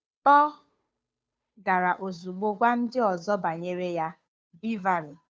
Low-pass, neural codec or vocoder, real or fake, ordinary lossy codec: none; codec, 16 kHz, 2 kbps, FunCodec, trained on Chinese and English, 25 frames a second; fake; none